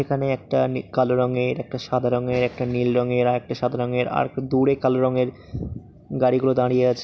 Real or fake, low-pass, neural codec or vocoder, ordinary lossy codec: real; none; none; none